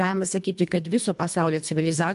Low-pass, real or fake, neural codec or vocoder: 10.8 kHz; fake; codec, 24 kHz, 1.5 kbps, HILCodec